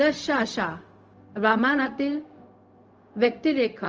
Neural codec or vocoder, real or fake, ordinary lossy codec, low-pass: codec, 16 kHz, 0.4 kbps, LongCat-Audio-Codec; fake; Opus, 24 kbps; 7.2 kHz